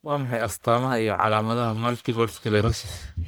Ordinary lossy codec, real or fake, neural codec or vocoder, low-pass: none; fake; codec, 44.1 kHz, 1.7 kbps, Pupu-Codec; none